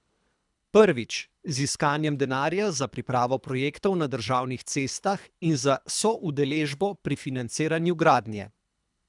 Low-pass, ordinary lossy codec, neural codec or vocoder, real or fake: 10.8 kHz; none; codec, 24 kHz, 3 kbps, HILCodec; fake